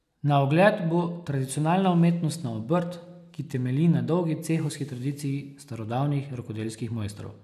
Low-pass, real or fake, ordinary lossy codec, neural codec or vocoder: 14.4 kHz; real; none; none